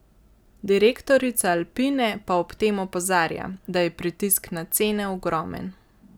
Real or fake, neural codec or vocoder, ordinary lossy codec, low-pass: real; none; none; none